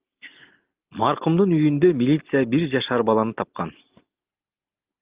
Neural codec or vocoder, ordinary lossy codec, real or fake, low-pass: none; Opus, 24 kbps; real; 3.6 kHz